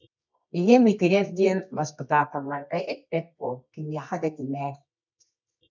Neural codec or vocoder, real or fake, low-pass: codec, 24 kHz, 0.9 kbps, WavTokenizer, medium music audio release; fake; 7.2 kHz